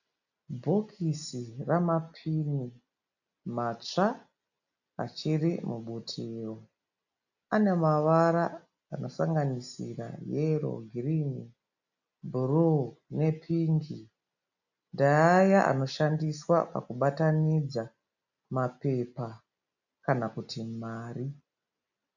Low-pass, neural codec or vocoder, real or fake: 7.2 kHz; none; real